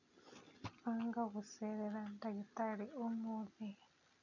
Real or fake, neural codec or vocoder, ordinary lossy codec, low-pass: real; none; none; 7.2 kHz